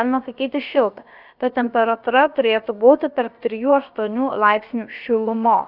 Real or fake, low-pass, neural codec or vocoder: fake; 5.4 kHz; codec, 16 kHz, about 1 kbps, DyCAST, with the encoder's durations